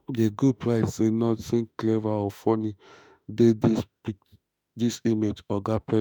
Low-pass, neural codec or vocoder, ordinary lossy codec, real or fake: none; autoencoder, 48 kHz, 32 numbers a frame, DAC-VAE, trained on Japanese speech; none; fake